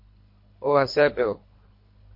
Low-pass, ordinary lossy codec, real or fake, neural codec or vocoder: 5.4 kHz; MP3, 32 kbps; fake; codec, 24 kHz, 3 kbps, HILCodec